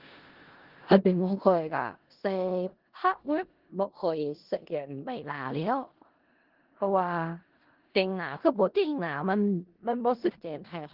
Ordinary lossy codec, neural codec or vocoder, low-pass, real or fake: Opus, 16 kbps; codec, 16 kHz in and 24 kHz out, 0.4 kbps, LongCat-Audio-Codec, four codebook decoder; 5.4 kHz; fake